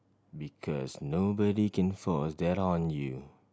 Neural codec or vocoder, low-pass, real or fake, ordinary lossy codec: none; none; real; none